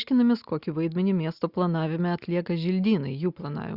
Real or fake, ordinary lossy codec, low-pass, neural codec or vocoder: real; Opus, 64 kbps; 5.4 kHz; none